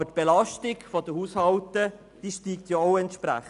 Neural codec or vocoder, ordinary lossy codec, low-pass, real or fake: none; none; 10.8 kHz; real